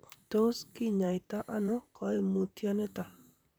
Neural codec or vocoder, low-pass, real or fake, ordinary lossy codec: vocoder, 44.1 kHz, 128 mel bands, Pupu-Vocoder; none; fake; none